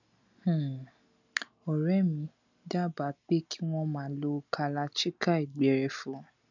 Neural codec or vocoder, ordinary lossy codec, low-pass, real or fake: none; none; 7.2 kHz; real